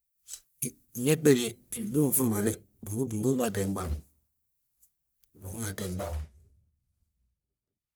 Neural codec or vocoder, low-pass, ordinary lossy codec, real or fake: codec, 44.1 kHz, 1.7 kbps, Pupu-Codec; none; none; fake